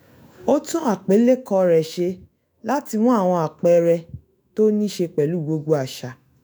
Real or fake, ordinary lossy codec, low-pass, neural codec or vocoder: fake; none; none; autoencoder, 48 kHz, 128 numbers a frame, DAC-VAE, trained on Japanese speech